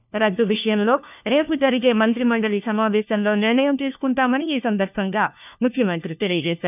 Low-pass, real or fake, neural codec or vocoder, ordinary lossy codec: 3.6 kHz; fake; codec, 16 kHz, 1 kbps, FunCodec, trained on LibriTTS, 50 frames a second; none